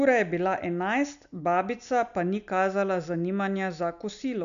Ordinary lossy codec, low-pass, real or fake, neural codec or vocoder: none; 7.2 kHz; real; none